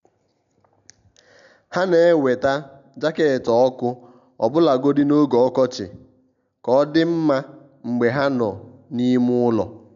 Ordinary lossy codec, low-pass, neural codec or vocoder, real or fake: none; 7.2 kHz; none; real